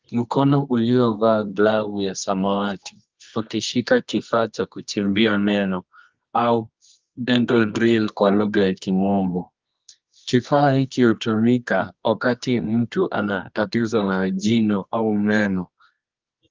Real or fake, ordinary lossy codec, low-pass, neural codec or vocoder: fake; Opus, 24 kbps; 7.2 kHz; codec, 24 kHz, 0.9 kbps, WavTokenizer, medium music audio release